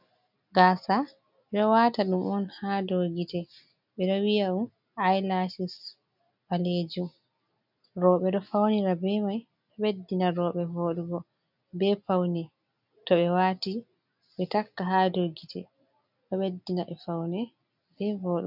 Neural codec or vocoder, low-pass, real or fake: none; 5.4 kHz; real